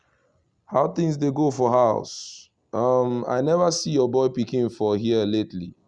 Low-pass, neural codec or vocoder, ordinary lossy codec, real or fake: 9.9 kHz; none; Opus, 64 kbps; real